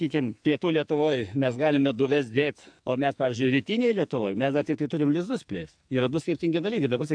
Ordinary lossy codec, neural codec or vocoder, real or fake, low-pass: AAC, 64 kbps; codec, 44.1 kHz, 2.6 kbps, SNAC; fake; 9.9 kHz